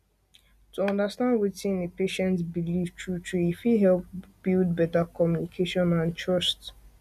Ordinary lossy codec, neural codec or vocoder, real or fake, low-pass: none; none; real; 14.4 kHz